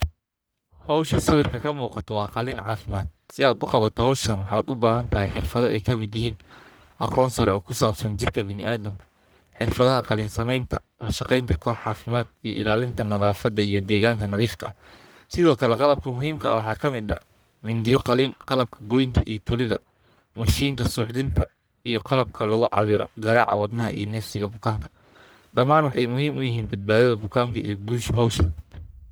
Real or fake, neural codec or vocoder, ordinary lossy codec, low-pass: fake; codec, 44.1 kHz, 1.7 kbps, Pupu-Codec; none; none